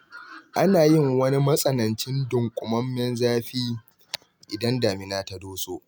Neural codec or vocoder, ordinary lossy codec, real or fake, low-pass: none; none; real; none